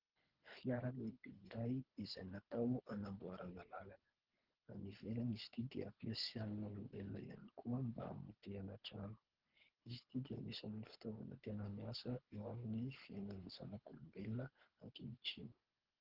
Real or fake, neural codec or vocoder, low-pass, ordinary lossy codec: fake; codec, 24 kHz, 3 kbps, HILCodec; 5.4 kHz; Opus, 16 kbps